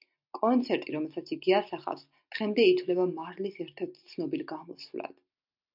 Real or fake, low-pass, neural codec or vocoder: real; 5.4 kHz; none